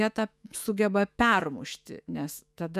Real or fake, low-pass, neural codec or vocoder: fake; 14.4 kHz; autoencoder, 48 kHz, 128 numbers a frame, DAC-VAE, trained on Japanese speech